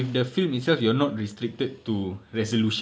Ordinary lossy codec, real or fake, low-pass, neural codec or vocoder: none; real; none; none